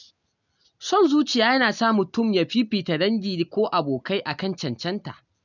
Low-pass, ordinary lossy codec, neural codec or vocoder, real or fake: 7.2 kHz; none; none; real